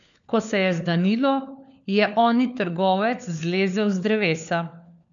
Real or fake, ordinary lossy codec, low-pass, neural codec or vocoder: fake; none; 7.2 kHz; codec, 16 kHz, 4 kbps, FunCodec, trained on LibriTTS, 50 frames a second